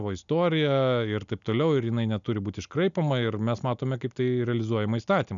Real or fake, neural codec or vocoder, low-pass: real; none; 7.2 kHz